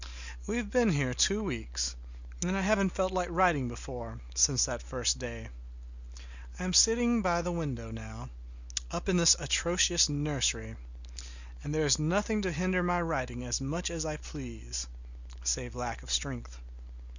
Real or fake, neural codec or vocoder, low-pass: real; none; 7.2 kHz